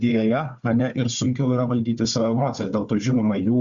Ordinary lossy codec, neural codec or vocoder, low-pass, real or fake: Opus, 64 kbps; codec, 16 kHz, 4 kbps, FunCodec, trained on Chinese and English, 50 frames a second; 7.2 kHz; fake